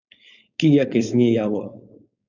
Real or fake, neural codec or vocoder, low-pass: fake; codec, 16 kHz, 4.8 kbps, FACodec; 7.2 kHz